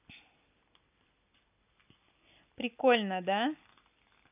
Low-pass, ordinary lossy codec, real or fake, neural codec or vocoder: 3.6 kHz; none; real; none